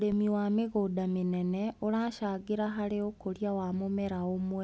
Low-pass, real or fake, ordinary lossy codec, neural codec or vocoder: none; real; none; none